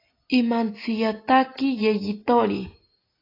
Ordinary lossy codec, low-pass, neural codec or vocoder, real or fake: AAC, 24 kbps; 5.4 kHz; none; real